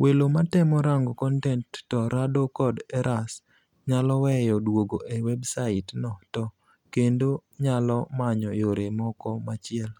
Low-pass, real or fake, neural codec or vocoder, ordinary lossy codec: 19.8 kHz; real; none; none